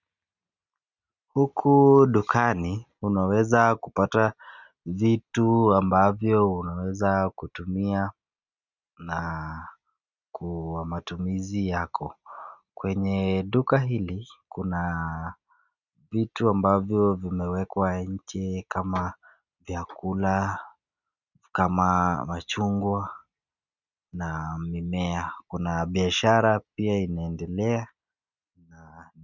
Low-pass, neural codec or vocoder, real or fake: 7.2 kHz; none; real